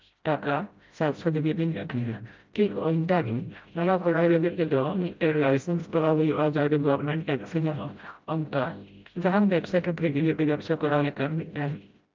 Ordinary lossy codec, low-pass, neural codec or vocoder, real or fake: Opus, 24 kbps; 7.2 kHz; codec, 16 kHz, 0.5 kbps, FreqCodec, smaller model; fake